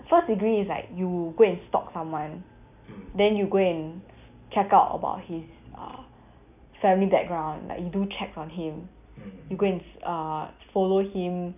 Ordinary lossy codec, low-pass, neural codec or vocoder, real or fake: none; 3.6 kHz; none; real